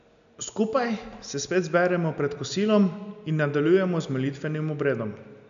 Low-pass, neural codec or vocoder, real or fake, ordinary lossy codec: 7.2 kHz; none; real; none